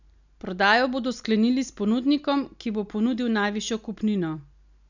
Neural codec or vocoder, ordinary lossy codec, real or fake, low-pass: none; none; real; 7.2 kHz